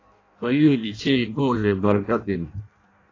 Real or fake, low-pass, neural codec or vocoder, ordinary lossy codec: fake; 7.2 kHz; codec, 16 kHz in and 24 kHz out, 0.6 kbps, FireRedTTS-2 codec; AAC, 32 kbps